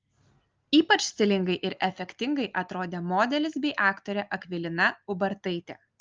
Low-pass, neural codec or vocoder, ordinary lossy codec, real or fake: 7.2 kHz; none; Opus, 24 kbps; real